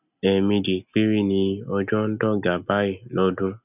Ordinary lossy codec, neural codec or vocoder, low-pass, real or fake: none; none; 3.6 kHz; real